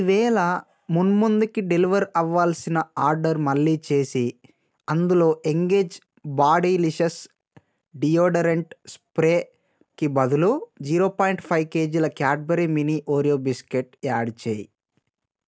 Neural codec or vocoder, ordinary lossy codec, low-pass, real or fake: none; none; none; real